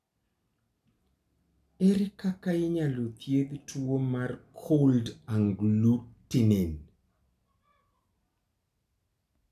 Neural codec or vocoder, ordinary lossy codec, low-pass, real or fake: none; none; 14.4 kHz; real